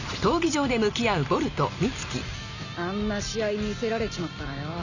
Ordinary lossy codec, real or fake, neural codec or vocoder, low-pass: none; real; none; 7.2 kHz